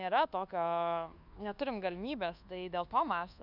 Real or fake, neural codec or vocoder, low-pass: fake; codec, 24 kHz, 1.2 kbps, DualCodec; 5.4 kHz